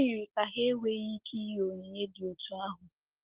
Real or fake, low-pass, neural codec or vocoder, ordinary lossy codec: real; 3.6 kHz; none; Opus, 16 kbps